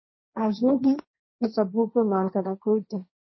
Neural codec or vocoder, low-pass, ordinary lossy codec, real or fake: codec, 16 kHz, 1.1 kbps, Voila-Tokenizer; 7.2 kHz; MP3, 24 kbps; fake